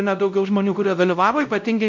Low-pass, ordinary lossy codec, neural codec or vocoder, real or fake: 7.2 kHz; MP3, 64 kbps; codec, 16 kHz, 0.5 kbps, X-Codec, WavLM features, trained on Multilingual LibriSpeech; fake